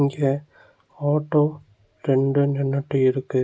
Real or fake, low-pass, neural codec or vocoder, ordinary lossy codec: real; none; none; none